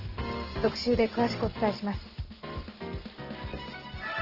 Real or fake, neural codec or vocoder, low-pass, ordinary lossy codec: real; none; 5.4 kHz; Opus, 16 kbps